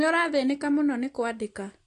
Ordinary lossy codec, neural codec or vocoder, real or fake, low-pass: AAC, 48 kbps; none; real; 10.8 kHz